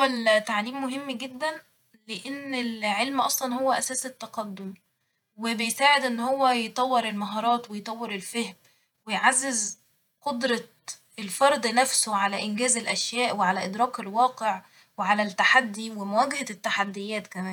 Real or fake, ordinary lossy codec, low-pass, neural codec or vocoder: fake; none; 19.8 kHz; vocoder, 48 kHz, 128 mel bands, Vocos